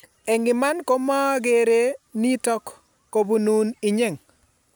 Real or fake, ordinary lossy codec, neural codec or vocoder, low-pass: real; none; none; none